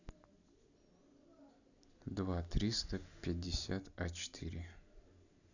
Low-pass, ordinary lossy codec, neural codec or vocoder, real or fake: 7.2 kHz; none; none; real